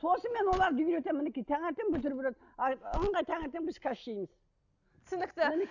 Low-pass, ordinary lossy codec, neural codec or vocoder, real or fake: 7.2 kHz; none; none; real